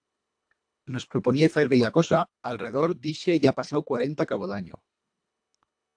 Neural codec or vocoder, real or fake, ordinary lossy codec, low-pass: codec, 24 kHz, 1.5 kbps, HILCodec; fake; AAC, 64 kbps; 9.9 kHz